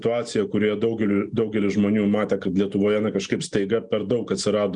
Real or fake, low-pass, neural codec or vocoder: real; 9.9 kHz; none